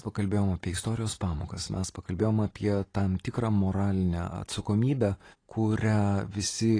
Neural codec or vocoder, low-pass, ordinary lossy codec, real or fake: none; 9.9 kHz; AAC, 32 kbps; real